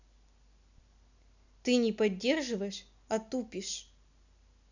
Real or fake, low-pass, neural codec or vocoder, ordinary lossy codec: real; 7.2 kHz; none; none